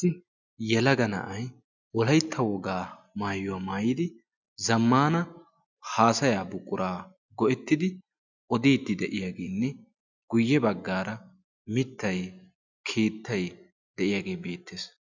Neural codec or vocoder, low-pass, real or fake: none; 7.2 kHz; real